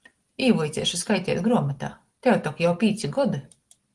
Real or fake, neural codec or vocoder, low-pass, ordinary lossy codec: real; none; 10.8 kHz; Opus, 24 kbps